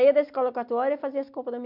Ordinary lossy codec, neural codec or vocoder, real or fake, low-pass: MP3, 48 kbps; none; real; 5.4 kHz